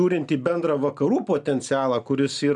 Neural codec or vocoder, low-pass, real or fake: none; 10.8 kHz; real